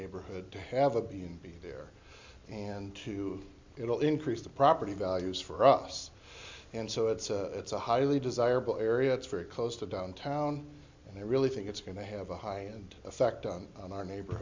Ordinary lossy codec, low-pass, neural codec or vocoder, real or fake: MP3, 64 kbps; 7.2 kHz; none; real